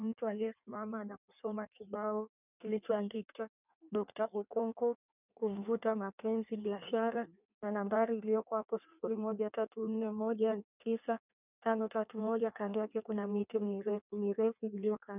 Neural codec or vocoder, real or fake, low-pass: codec, 16 kHz in and 24 kHz out, 1.1 kbps, FireRedTTS-2 codec; fake; 3.6 kHz